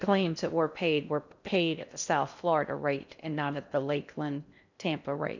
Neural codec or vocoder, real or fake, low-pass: codec, 16 kHz in and 24 kHz out, 0.6 kbps, FocalCodec, streaming, 4096 codes; fake; 7.2 kHz